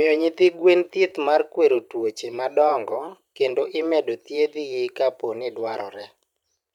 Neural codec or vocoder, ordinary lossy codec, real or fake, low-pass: vocoder, 44.1 kHz, 128 mel bands every 512 samples, BigVGAN v2; none; fake; 19.8 kHz